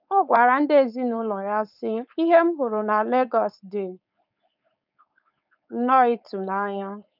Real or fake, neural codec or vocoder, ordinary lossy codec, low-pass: fake; codec, 16 kHz, 4.8 kbps, FACodec; none; 5.4 kHz